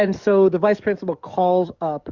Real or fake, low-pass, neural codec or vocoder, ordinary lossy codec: fake; 7.2 kHz; codec, 44.1 kHz, 7.8 kbps, DAC; Opus, 64 kbps